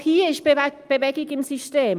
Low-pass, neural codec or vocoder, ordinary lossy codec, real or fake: 14.4 kHz; none; Opus, 32 kbps; real